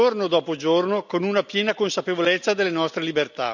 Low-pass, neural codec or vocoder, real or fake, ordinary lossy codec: 7.2 kHz; none; real; none